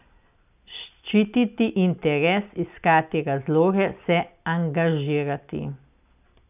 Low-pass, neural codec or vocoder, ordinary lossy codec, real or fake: 3.6 kHz; none; none; real